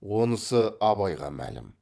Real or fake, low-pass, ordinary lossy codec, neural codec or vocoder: fake; none; none; vocoder, 22.05 kHz, 80 mel bands, WaveNeXt